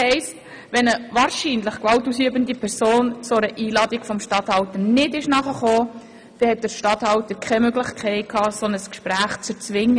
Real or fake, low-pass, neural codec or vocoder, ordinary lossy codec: real; 9.9 kHz; none; none